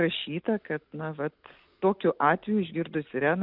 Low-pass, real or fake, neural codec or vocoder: 5.4 kHz; real; none